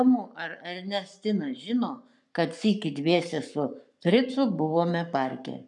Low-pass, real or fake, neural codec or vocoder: 10.8 kHz; fake; codec, 44.1 kHz, 7.8 kbps, Pupu-Codec